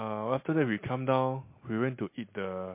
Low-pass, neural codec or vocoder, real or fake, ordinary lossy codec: 3.6 kHz; none; real; MP3, 24 kbps